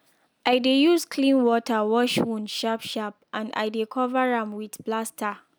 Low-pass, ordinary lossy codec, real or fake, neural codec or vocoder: none; none; real; none